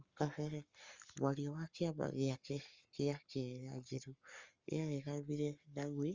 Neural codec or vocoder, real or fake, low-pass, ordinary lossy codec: codec, 44.1 kHz, 7.8 kbps, Pupu-Codec; fake; 7.2 kHz; Opus, 32 kbps